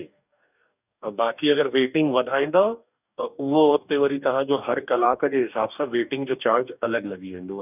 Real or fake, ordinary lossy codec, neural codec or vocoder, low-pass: fake; none; codec, 44.1 kHz, 2.6 kbps, DAC; 3.6 kHz